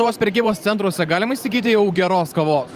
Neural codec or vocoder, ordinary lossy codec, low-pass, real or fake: vocoder, 44.1 kHz, 128 mel bands every 512 samples, BigVGAN v2; Opus, 32 kbps; 14.4 kHz; fake